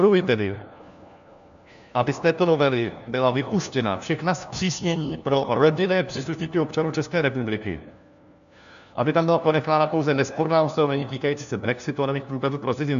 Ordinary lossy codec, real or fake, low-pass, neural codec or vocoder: AAC, 96 kbps; fake; 7.2 kHz; codec, 16 kHz, 1 kbps, FunCodec, trained on LibriTTS, 50 frames a second